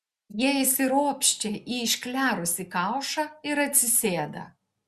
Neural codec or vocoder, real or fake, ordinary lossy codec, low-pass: none; real; Opus, 64 kbps; 14.4 kHz